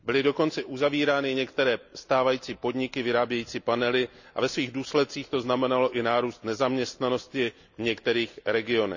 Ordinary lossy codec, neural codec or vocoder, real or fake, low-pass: none; none; real; 7.2 kHz